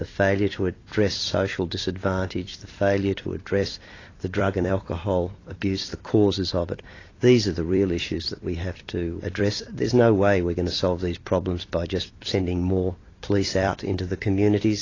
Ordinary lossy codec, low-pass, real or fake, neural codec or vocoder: AAC, 32 kbps; 7.2 kHz; fake; vocoder, 44.1 kHz, 128 mel bands every 256 samples, BigVGAN v2